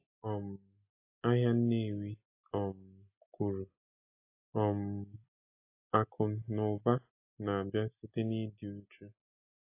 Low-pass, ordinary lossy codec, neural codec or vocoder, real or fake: 3.6 kHz; AAC, 32 kbps; none; real